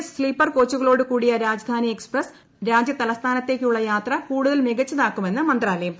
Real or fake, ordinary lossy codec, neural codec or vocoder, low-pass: real; none; none; none